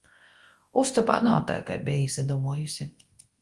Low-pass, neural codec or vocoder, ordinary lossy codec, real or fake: 10.8 kHz; codec, 24 kHz, 0.9 kbps, WavTokenizer, large speech release; Opus, 24 kbps; fake